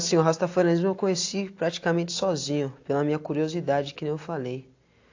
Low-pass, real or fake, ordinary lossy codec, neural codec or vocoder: 7.2 kHz; real; AAC, 48 kbps; none